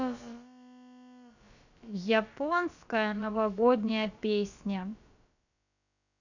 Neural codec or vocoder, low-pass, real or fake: codec, 16 kHz, about 1 kbps, DyCAST, with the encoder's durations; 7.2 kHz; fake